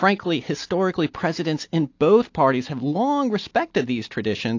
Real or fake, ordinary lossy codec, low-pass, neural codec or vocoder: real; AAC, 48 kbps; 7.2 kHz; none